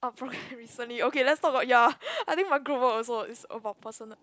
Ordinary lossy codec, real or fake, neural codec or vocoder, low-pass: none; real; none; none